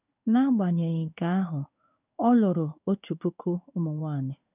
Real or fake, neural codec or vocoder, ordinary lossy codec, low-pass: fake; codec, 16 kHz in and 24 kHz out, 1 kbps, XY-Tokenizer; none; 3.6 kHz